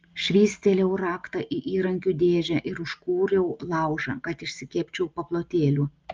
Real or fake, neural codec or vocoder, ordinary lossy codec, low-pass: real; none; Opus, 24 kbps; 7.2 kHz